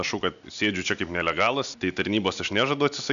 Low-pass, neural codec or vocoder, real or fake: 7.2 kHz; none; real